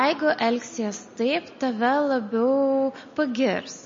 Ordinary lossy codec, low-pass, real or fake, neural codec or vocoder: MP3, 32 kbps; 7.2 kHz; real; none